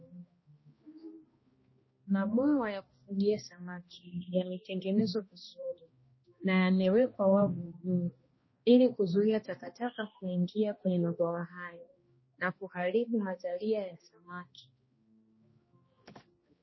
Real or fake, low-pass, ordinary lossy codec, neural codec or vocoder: fake; 7.2 kHz; MP3, 24 kbps; codec, 16 kHz, 1 kbps, X-Codec, HuBERT features, trained on balanced general audio